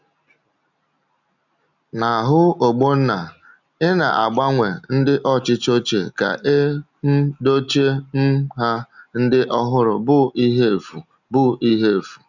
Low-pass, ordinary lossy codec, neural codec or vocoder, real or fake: 7.2 kHz; none; none; real